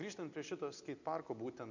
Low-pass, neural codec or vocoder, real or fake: 7.2 kHz; none; real